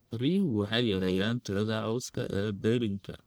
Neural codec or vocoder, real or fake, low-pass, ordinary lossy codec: codec, 44.1 kHz, 1.7 kbps, Pupu-Codec; fake; none; none